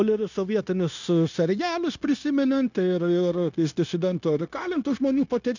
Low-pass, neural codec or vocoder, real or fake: 7.2 kHz; codec, 16 kHz, 0.9 kbps, LongCat-Audio-Codec; fake